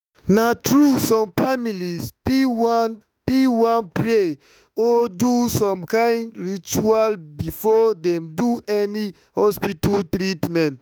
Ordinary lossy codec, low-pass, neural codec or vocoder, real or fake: none; none; autoencoder, 48 kHz, 32 numbers a frame, DAC-VAE, trained on Japanese speech; fake